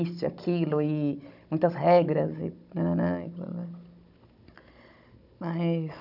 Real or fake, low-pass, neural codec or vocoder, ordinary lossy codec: fake; 5.4 kHz; codec, 16 kHz, 16 kbps, FunCodec, trained on Chinese and English, 50 frames a second; none